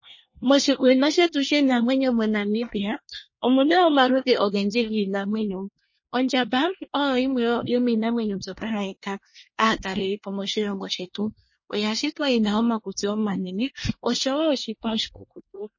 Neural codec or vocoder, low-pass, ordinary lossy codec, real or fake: codec, 24 kHz, 1 kbps, SNAC; 7.2 kHz; MP3, 32 kbps; fake